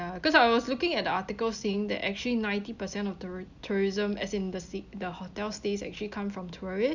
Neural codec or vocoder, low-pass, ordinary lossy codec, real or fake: none; 7.2 kHz; none; real